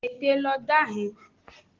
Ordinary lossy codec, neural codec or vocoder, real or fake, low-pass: Opus, 16 kbps; none; real; 7.2 kHz